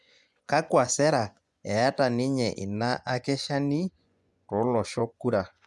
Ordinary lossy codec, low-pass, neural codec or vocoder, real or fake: none; none; vocoder, 24 kHz, 100 mel bands, Vocos; fake